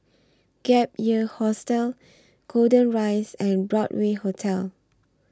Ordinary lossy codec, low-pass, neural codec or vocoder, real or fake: none; none; none; real